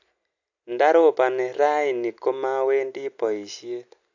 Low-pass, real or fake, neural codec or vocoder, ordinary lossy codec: 7.2 kHz; real; none; AAC, 48 kbps